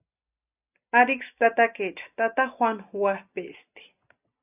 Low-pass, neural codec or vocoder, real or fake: 3.6 kHz; none; real